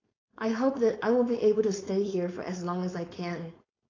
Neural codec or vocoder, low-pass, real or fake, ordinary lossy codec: codec, 16 kHz, 4.8 kbps, FACodec; 7.2 kHz; fake; AAC, 32 kbps